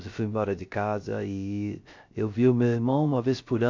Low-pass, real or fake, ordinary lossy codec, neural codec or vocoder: 7.2 kHz; fake; MP3, 48 kbps; codec, 16 kHz, 0.3 kbps, FocalCodec